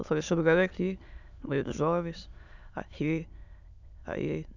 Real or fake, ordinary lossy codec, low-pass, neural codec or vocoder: fake; none; 7.2 kHz; autoencoder, 22.05 kHz, a latent of 192 numbers a frame, VITS, trained on many speakers